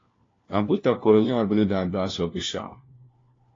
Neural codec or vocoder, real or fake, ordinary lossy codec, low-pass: codec, 16 kHz, 1 kbps, FunCodec, trained on LibriTTS, 50 frames a second; fake; AAC, 32 kbps; 7.2 kHz